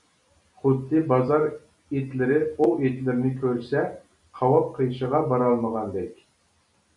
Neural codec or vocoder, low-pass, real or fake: none; 10.8 kHz; real